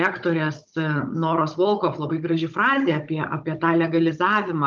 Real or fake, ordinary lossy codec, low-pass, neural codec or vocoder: fake; Opus, 24 kbps; 7.2 kHz; codec, 16 kHz, 16 kbps, FunCodec, trained on Chinese and English, 50 frames a second